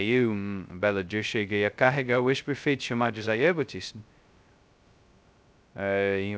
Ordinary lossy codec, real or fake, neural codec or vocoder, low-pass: none; fake; codec, 16 kHz, 0.2 kbps, FocalCodec; none